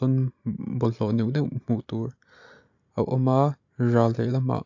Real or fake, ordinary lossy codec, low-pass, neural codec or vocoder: real; AAC, 48 kbps; 7.2 kHz; none